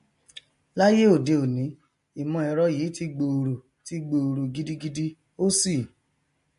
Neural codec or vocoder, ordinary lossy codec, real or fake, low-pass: none; MP3, 48 kbps; real; 10.8 kHz